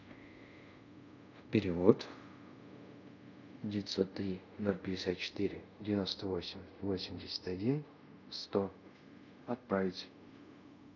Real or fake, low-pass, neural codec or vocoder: fake; 7.2 kHz; codec, 24 kHz, 0.5 kbps, DualCodec